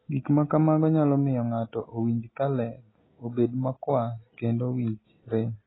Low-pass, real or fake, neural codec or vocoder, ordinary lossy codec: 7.2 kHz; real; none; AAC, 16 kbps